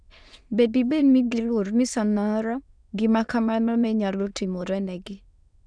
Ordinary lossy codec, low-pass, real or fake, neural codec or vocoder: none; 9.9 kHz; fake; autoencoder, 22.05 kHz, a latent of 192 numbers a frame, VITS, trained on many speakers